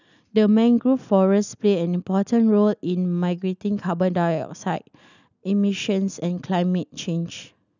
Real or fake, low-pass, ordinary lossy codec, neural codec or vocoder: real; 7.2 kHz; none; none